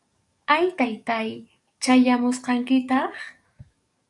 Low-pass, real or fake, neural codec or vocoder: 10.8 kHz; fake; codec, 44.1 kHz, 7.8 kbps, DAC